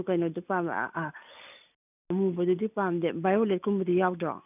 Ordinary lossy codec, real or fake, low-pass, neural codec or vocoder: none; real; 3.6 kHz; none